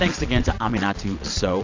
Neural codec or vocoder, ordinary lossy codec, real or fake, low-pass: vocoder, 22.05 kHz, 80 mel bands, Vocos; AAC, 48 kbps; fake; 7.2 kHz